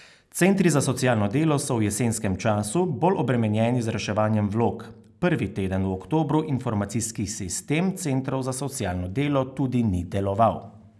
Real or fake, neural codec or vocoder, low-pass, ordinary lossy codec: real; none; none; none